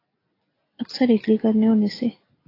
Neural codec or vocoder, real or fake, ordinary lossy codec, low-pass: none; real; AAC, 24 kbps; 5.4 kHz